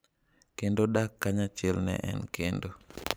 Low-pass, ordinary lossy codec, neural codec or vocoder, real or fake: none; none; vocoder, 44.1 kHz, 128 mel bands every 512 samples, BigVGAN v2; fake